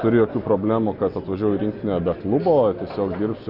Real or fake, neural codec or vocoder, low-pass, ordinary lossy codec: real; none; 5.4 kHz; Opus, 64 kbps